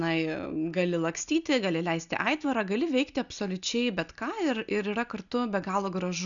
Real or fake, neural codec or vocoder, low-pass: real; none; 7.2 kHz